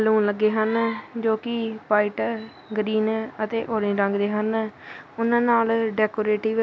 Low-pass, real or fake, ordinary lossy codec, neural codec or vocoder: none; real; none; none